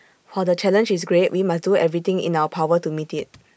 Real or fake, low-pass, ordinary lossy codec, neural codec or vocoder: real; none; none; none